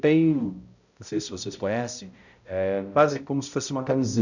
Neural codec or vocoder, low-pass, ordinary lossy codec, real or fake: codec, 16 kHz, 0.5 kbps, X-Codec, HuBERT features, trained on balanced general audio; 7.2 kHz; none; fake